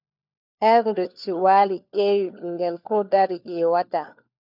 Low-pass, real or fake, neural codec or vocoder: 5.4 kHz; fake; codec, 16 kHz, 4 kbps, FunCodec, trained on LibriTTS, 50 frames a second